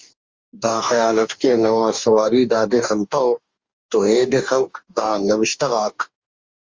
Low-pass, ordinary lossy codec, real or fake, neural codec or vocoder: 7.2 kHz; Opus, 32 kbps; fake; codec, 44.1 kHz, 2.6 kbps, DAC